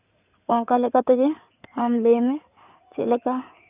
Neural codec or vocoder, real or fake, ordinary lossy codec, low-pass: codec, 16 kHz in and 24 kHz out, 2.2 kbps, FireRedTTS-2 codec; fake; none; 3.6 kHz